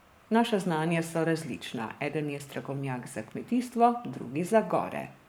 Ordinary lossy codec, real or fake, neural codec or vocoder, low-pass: none; fake; codec, 44.1 kHz, 7.8 kbps, Pupu-Codec; none